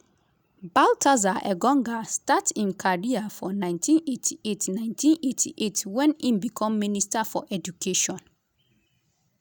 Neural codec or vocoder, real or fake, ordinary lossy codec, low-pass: none; real; none; none